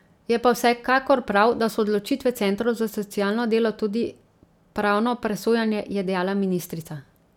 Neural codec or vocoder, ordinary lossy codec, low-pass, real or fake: none; none; 19.8 kHz; real